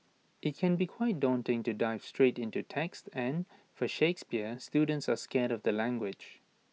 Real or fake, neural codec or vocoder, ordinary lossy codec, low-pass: real; none; none; none